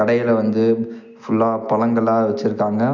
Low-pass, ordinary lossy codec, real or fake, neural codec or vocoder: 7.2 kHz; none; real; none